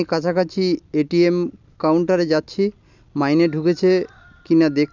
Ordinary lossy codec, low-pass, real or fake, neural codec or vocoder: none; 7.2 kHz; real; none